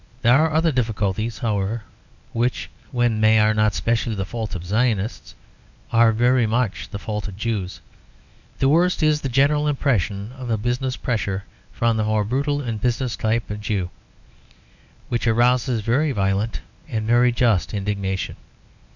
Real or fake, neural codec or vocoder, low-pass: fake; codec, 24 kHz, 0.9 kbps, WavTokenizer, medium speech release version 1; 7.2 kHz